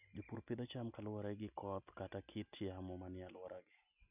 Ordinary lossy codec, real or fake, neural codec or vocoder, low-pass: none; real; none; 3.6 kHz